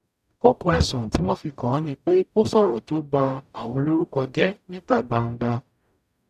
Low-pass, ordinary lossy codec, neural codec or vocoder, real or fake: 14.4 kHz; none; codec, 44.1 kHz, 0.9 kbps, DAC; fake